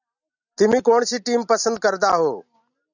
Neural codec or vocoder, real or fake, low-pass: none; real; 7.2 kHz